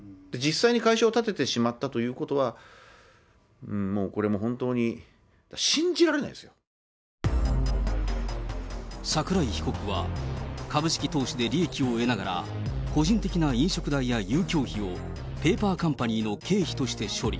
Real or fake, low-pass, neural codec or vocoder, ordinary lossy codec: real; none; none; none